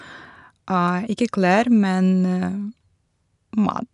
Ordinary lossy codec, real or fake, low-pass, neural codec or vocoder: none; real; 10.8 kHz; none